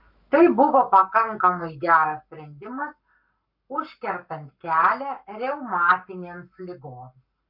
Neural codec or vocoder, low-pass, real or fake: codec, 44.1 kHz, 7.8 kbps, Pupu-Codec; 5.4 kHz; fake